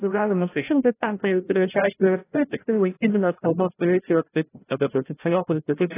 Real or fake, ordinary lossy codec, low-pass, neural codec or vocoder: fake; AAC, 16 kbps; 3.6 kHz; codec, 16 kHz, 0.5 kbps, FreqCodec, larger model